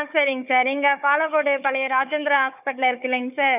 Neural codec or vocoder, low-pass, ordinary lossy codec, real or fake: codec, 16 kHz, 4 kbps, FunCodec, trained on Chinese and English, 50 frames a second; 3.6 kHz; none; fake